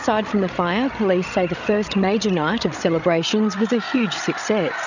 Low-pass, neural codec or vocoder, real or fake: 7.2 kHz; codec, 16 kHz, 16 kbps, FreqCodec, larger model; fake